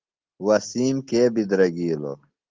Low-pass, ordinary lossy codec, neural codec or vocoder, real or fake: 7.2 kHz; Opus, 16 kbps; none; real